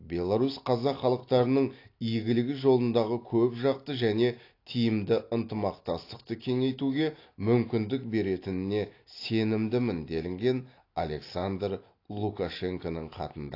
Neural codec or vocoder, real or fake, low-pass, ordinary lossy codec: none; real; 5.4 kHz; AAC, 32 kbps